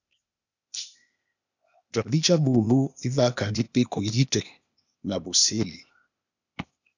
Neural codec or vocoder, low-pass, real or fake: codec, 16 kHz, 0.8 kbps, ZipCodec; 7.2 kHz; fake